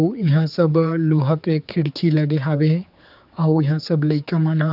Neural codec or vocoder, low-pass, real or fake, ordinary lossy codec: codec, 16 kHz, 4 kbps, X-Codec, HuBERT features, trained on general audio; 5.4 kHz; fake; none